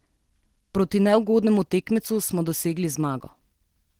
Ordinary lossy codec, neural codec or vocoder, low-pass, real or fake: Opus, 16 kbps; none; 19.8 kHz; real